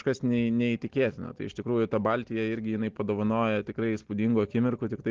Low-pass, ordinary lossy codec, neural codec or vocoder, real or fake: 7.2 kHz; Opus, 16 kbps; none; real